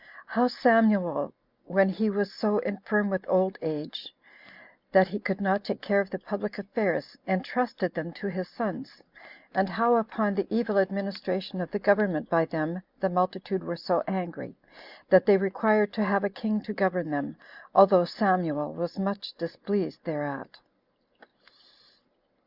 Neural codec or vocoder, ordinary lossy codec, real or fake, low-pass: none; Opus, 64 kbps; real; 5.4 kHz